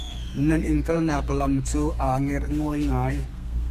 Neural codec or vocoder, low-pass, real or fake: codec, 32 kHz, 1.9 kbps, SNAC; 14.4 kHz; fake